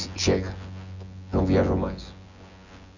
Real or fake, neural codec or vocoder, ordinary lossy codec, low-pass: fake; vocoder, 24 kHz, 100 mel bands, Vocos; none; 7.2 kHz